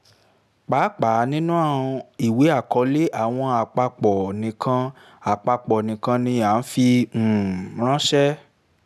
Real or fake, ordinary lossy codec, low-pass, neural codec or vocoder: real; none; 14.4 kHz; none